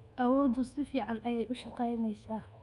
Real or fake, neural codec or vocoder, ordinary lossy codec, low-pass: fake; codec, 24 kHz, 1.2 kbps, DualCodec; none; 10.8 kHz